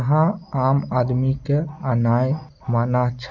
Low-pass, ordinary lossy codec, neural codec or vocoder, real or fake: 7.2 kHz; none; none; real